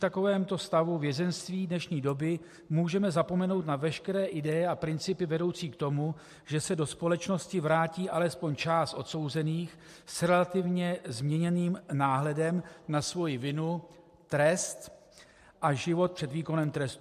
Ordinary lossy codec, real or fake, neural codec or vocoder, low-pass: MP3, 64 kbps; real; none; 14.4 kHz